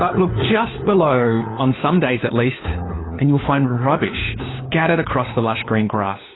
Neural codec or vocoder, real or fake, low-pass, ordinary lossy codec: codec, 16 kHz, 4 kbps, FunCodec, trained on LibriTTS, 50 frames a second; fake; 7.2 kHz; AAC, 16 kbps